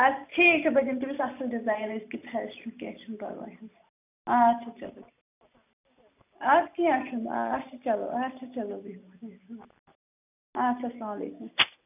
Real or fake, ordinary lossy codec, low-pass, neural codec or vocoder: real; none; 3.6 kHz; none